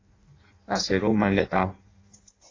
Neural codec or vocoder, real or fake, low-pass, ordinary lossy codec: codec, 16 kHz in and 24 kHz out, 0.6 kbps, FireRedTTS-2 codec; fake; 7.2 kHz; AAC, 32 kbps